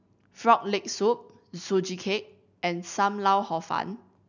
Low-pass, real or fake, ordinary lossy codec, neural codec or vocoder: 7.2 kHz; real; none; none